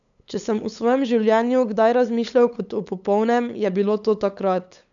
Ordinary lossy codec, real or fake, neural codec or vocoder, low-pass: none; fake; codec, 16 kHz, 8 kbps, FunCodec, trained on LibriTTS, 25 frames a second; 7.2 kHz